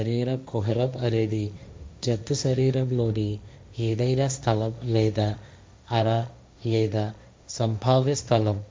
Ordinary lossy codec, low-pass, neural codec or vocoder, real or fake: none; none; codec, 16 kHz, 1.1 kbps, Voila-Tokenizer; fake